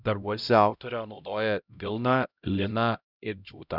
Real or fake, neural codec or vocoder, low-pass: fake; codec, 16 kHz, 0.5 kbps, X-Codec, HuBERT features, trained on LibriSpeech; 5.4 kHz